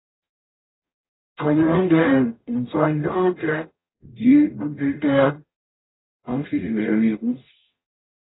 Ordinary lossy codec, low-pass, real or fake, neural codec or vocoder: AAC, 16 kbps; 7.2 kHz; fake; codec, 44.1 kHz, 0.9 kbps, DAC